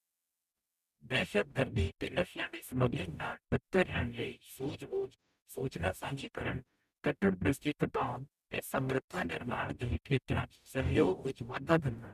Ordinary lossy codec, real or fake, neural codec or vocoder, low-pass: none; fake; codec, 44.1 kHz, 0.9 kbps, DAC; 14.4 kHz